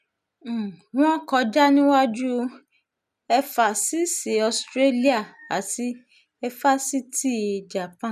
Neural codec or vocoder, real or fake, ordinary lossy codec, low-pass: none; real; none; 14.4 kHz